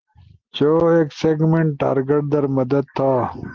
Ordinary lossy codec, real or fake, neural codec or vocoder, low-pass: Opus, 16 kbps; real; none; 7.2 kHz